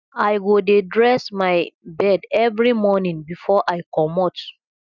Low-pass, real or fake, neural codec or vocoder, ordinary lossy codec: 7.2 kHz; real; none; none